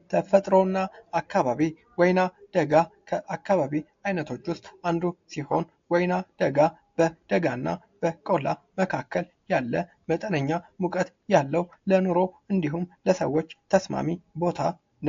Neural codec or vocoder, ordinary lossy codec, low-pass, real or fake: none; AAC, 48 kbps; 7.2 kHz; real